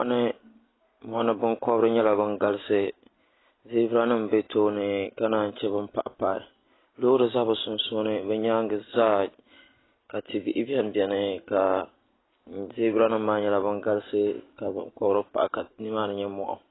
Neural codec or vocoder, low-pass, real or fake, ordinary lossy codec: none; 7.2 kHz; real; AAC, 16 kbps